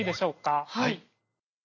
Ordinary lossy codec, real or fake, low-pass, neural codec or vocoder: none; real; 7.2 kHz; none